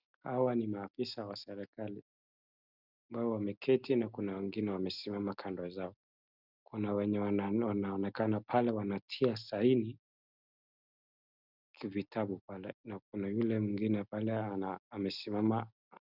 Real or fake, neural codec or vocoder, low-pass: real; none; 5.4 kHz